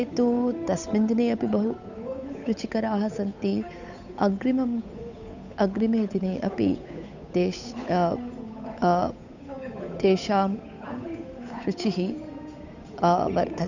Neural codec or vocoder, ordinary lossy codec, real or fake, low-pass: codec, 16 kHz, 8 kbps, FunCodec, trained on Chinese and English, 25 frames a second; none; fake; 7.2 kHz